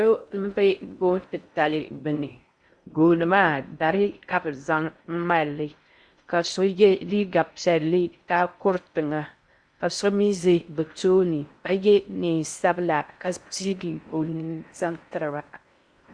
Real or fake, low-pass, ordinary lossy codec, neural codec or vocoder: fake; 9.9 kHz; Opus, 64 kbps; codec, 16 kHz in and 24 kHz out, 0.6 kbps, FocalCodec, streaming, 4096 codes